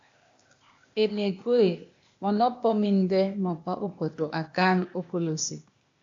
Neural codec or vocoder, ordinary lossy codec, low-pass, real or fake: codec, 16 kHz, 0.8 kbps, ZipCodec; AAC, 64 kbps; 7.2 kHz; fake